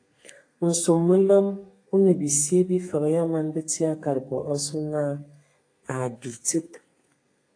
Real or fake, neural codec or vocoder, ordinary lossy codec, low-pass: fake; codec, 32 kHz, 1.9 kbps, SNAC; AAC, 48 kbps; 9.9 kHz